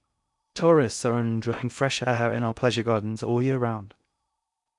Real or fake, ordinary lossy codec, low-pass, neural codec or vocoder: fake; none; 10.8 kHz; codec, 16 kHz in and 24 kHz out, 0.6 kbps, FocalCodec, streaming, 2048 codes